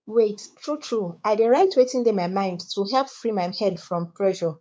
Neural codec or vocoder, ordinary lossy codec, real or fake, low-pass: codec, 16 kHz, 4 kbps, X-Codec, WavLM features, trained on Multilingual LibriSpeech; none; fake; none